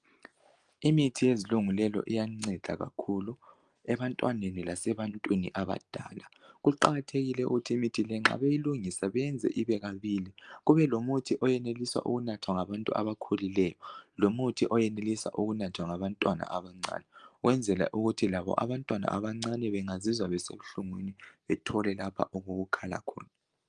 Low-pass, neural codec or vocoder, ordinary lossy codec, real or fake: 9.9 kHz; none; Opus, 32 kbps; real